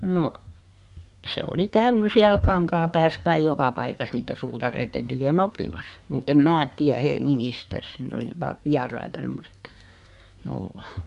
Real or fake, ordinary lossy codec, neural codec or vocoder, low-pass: fake; none; codec, 24 kHz, 1 kbps, SNAC; 10.8 kHz